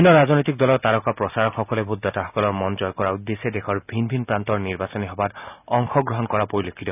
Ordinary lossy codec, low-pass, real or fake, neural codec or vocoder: none; 3.6 kHz; real; none